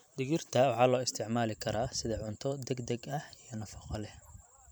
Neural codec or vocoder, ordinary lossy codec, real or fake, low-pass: vocoder, 44.1 kHz, 128 mel bands every 512 samples, BigVGAN v2; none; fake; none